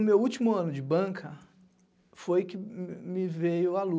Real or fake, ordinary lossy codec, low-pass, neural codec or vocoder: real; none; none; none